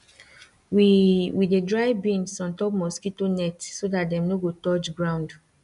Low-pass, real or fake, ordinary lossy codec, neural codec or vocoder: 10.8 kHz; real; none; none